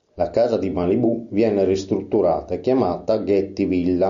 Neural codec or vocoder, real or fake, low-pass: none; real; 7.2 kHz